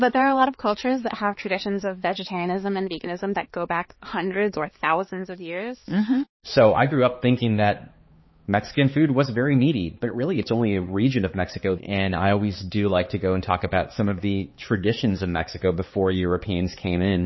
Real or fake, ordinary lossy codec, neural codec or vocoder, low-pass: fake; MP3, 24 kbps; codec, 16 kHz, 4 kbps, X-Codec, HuBERT features, trained on balanced general audio; 7.2 kHz